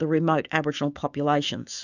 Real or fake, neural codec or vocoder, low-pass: fake; autoencoder, 48 kHz, 128 numbers a frame, DAC-VAE, trained on Japanese speech; 7.2 kHz